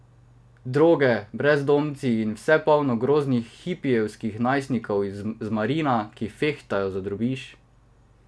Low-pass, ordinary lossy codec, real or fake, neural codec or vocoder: none; none; real; none